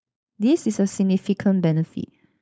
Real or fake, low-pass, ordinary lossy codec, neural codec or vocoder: fake; none; none; codec, 16 kHz, 4.8 kbps, FACodec